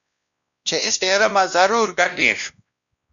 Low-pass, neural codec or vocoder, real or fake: 7.2 kHz; codec, 16 kHz, 1 kbps, X-Codec, WavLM features, trained on Multilingual LibriSpeech; fake